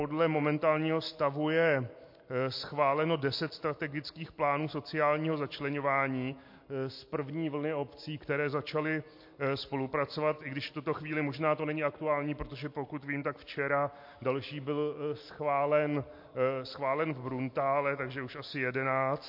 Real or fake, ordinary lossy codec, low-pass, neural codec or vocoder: real; MP3, 32 kbps; 5.4 kHz; none